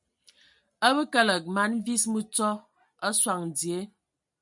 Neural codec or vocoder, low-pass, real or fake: none; 10.8 kHz; real